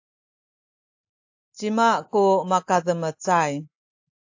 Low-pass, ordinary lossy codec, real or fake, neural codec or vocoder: 7.2 kHz; AAC, 48 kbps; real; none